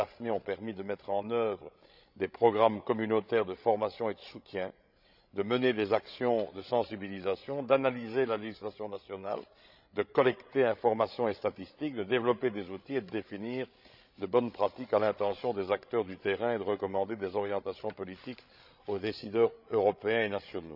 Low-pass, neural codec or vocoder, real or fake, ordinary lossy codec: 5.4 kHz; codec, 16 kHz, 16 kbps, FreqCodec, larger model; fake; none